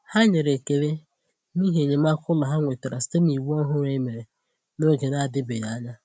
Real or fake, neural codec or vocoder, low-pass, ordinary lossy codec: real; none; none; none